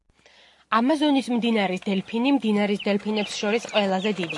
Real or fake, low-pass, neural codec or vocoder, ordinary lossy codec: real; 10.8 kHz; none; AAC, 64 kbps